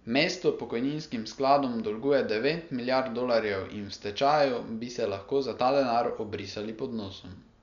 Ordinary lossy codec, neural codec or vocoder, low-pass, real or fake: none; none; 7.2 kHz; real